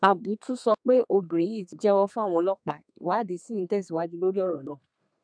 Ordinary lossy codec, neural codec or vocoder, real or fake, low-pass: MP3, 96 kbps; codec, 32 kHz, 1.9 kbps, SNAC; fake; 9.9 kHz